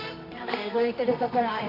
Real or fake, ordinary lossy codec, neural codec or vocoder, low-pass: fake; none; codec, 24 kHz, 0.9 kbps, WavTokenizer, medium music audio release; 5.4 kHz